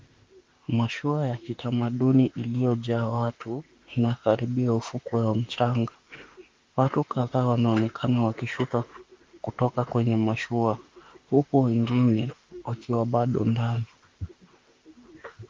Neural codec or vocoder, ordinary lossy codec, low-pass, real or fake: autoencoder, 48 kHz, 32 numbers a frame, DAC-VAE, trained on Japanese speech; Opus, 32 kbps; 7.2 kHz; fake